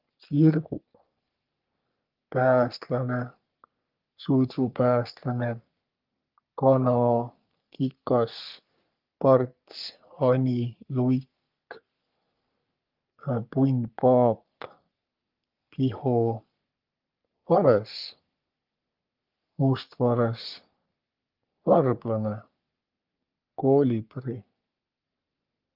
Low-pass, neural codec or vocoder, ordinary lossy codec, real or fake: 5.4 kHz; codec, 44.1 kHz, 3.4 kbps, Pupu-Codec; Opus, 32 kbps; fake